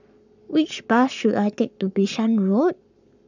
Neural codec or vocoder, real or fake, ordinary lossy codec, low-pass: codec, 44.1 kHz, 7.8 kbps, Pupu-Codec; fake; none; 7.2 kHz